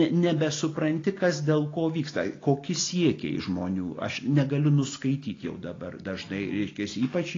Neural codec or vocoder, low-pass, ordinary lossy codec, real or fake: none; 7.2 kHz; AAC, 32 kbps; real